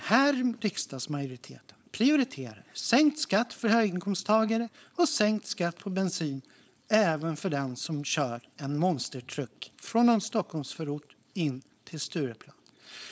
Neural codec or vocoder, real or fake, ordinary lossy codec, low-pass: codec, 16 kHz, 4.8 kbps, FACodec; fake; none; none